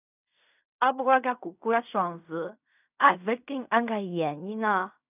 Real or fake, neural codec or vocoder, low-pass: fake; codec, 16 kHz in and 24 kHz out, 0.4 kbps, LongCat-Audio-Codec, fine tuned four codebook decoder; 3.6 kHz